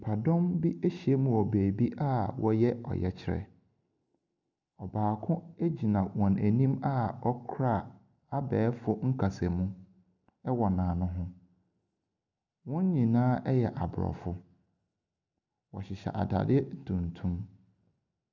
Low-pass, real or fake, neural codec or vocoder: 7.2 kHz; real; none